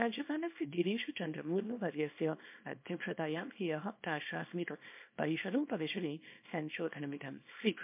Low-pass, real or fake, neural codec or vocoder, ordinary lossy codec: 3.6 kHz; fake; codec, 24 kHz, 0.9 kbps, WavTokenizer, small release; MP3, 32 kbps